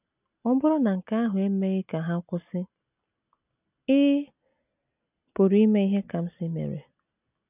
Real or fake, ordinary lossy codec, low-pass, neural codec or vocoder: real; none; 3.6 kHz; none